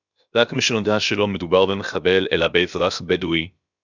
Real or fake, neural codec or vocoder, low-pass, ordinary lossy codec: fake; codec, 16 kHz, about 1 kbps, DyCAST, with the encoder's durations; 7.2 kHz; Opus, 64 kbps